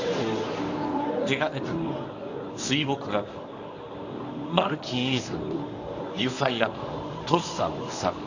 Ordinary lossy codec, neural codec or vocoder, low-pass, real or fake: none; codec, 24 kHz, 0.9 kbps, WavTokenizer, medium speech release version 1; 7.2 kHz; fake